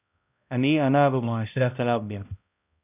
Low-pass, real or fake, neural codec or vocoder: 3.6 kHz; fake; codec, 16 kHz, 0.5 kbps, X-Codec, HuBERT features, trained on balanced general audio